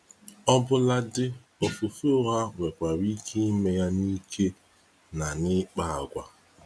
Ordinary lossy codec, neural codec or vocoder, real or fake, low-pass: none; none; real; none